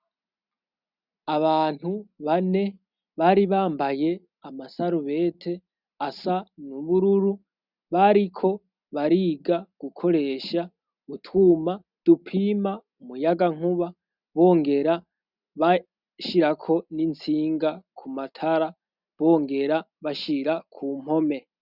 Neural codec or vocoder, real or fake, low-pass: none; real; 5.4 kHz